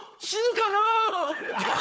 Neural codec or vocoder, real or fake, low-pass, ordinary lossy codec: codec, 16 kHz, 4 kbps, FunCodec, trained on Chinese and English, 50 frames a second; fake; none; none